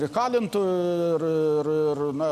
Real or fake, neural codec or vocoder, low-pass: real; none; 14.4 kHz